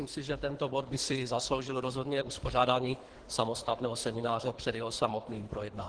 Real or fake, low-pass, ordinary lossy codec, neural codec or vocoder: fake; 9.9 kHz; Opus, 16 kbps; codec, 24 kHz, 3 kbps, HILCodec